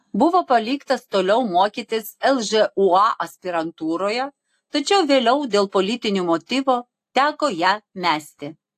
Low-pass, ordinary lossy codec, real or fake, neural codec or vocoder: 14.4 kHz; AAC, 64 kbps; real; none